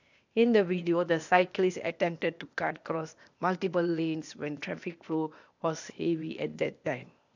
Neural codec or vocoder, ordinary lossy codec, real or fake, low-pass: codec, 16 kHz, 0.8 kbps, ZipCodec; none; fake; 7.2 kHz